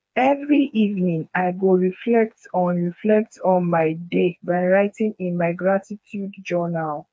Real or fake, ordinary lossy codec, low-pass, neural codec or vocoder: fake; none; none; codec, 16 kHz, 4 kbps, FreqCodec, smaller model